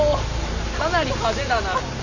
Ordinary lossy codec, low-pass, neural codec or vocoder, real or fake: none; 7.2 kHz; none; real